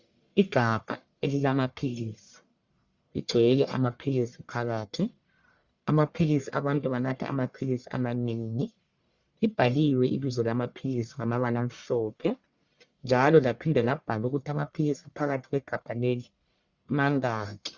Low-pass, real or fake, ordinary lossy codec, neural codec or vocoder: 7.2 kHz; fake; Opus, 64 kbps; codec, 44.1 kHz, 1.7 kbps, Pupu-Codec